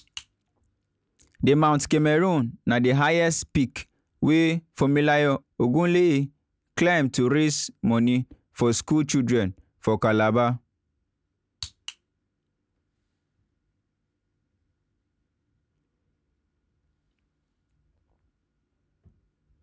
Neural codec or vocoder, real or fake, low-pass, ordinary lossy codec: none; real; none; none